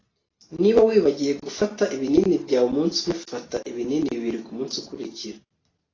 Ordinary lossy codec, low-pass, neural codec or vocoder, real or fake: AAC, 32 kbps; 7.2 kHz; none; real